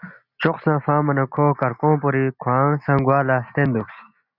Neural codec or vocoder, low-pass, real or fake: none; 5.4 kHz; real